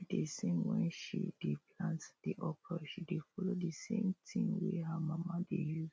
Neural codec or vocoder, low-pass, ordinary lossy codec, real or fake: none; none; none; real